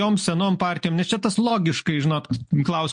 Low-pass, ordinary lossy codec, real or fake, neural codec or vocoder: 9.9 kHz; MP3, 48 kbps; real; none